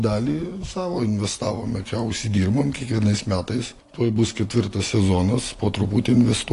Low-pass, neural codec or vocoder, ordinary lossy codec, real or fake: 10.8 kHz; none; AAC, 48 kbps; real